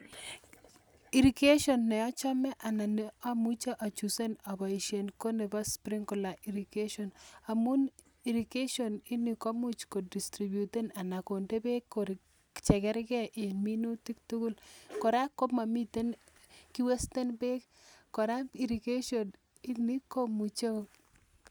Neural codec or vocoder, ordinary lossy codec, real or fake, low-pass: none; none; real; none